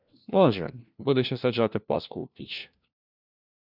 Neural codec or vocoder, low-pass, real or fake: codec, 16 kHz, 1 kbps, FunCodec, trained on LibriTTS, 50 frames a second; 5.4 kHz; fake